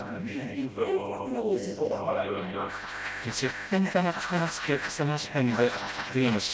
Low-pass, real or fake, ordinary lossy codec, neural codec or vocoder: none; fake; none; codec, 16 kHz, 0.5 kbps, FreqCodec, smaller model